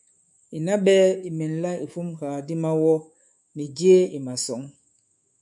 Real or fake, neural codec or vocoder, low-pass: fake; codec, 24 kHz, 3.1 kbps, DualCodec; 10.8 kHz